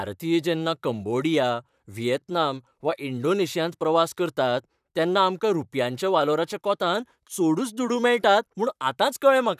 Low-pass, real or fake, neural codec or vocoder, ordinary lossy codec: 14.4 kHz; fake; vocoder, 44.1 kHz, 128 mel bands, Pupu-Vocoder; none